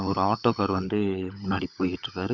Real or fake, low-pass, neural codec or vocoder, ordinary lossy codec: fake; 7.2 kHz; codec, 16 kHz, 16 kbps, FunCodec, trained on LibriTTS, 50 frames a second; none